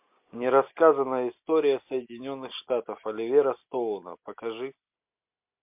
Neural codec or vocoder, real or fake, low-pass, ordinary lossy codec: none; real; 3.6 kHz; MP3, 32 kbps